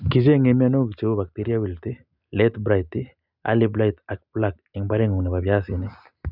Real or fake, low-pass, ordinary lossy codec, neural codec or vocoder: real; 5.4 kHz; none; none